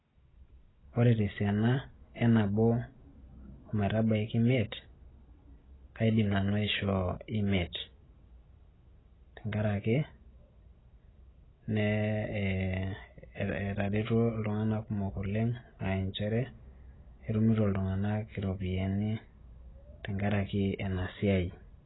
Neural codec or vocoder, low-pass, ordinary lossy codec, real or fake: none; 7.2 kHz; AAC, 16 kbps; real